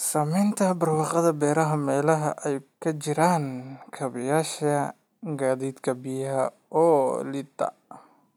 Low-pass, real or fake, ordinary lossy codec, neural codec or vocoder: none; real; none; none